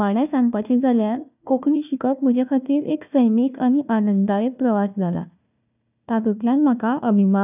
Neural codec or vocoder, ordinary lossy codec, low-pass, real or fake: codec, 16 kHz, 1 kbps, FunCodec, trained on Chinese and English, 50 frames a second; none; 3.6 kHz; fake